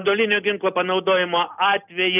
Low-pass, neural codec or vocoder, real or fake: 3.6 kHz; none; real